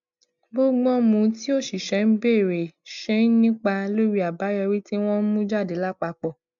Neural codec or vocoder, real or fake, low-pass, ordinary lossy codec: none; real; 7.2 kHz; MP3, 96 kbps